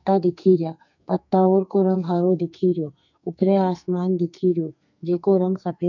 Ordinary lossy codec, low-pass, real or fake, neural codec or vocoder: none; 7.2 kHz; fake; codec, 44.1 kHz, 2.6 kbps, SNAC